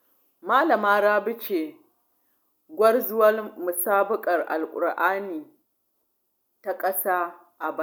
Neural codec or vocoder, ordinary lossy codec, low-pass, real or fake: none; none; none; real